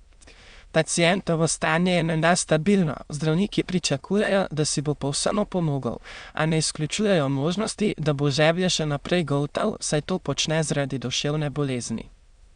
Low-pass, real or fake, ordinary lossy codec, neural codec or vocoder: 9.9 kHz; fake; Opus, 64 kbps; autoencoder, 22.05 kHz, a latent of 192 numbers a frame, VITS, trained on many speakers